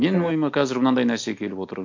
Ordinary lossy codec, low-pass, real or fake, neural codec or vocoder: MP3, 48 kbps; 7.2 kHz; real; none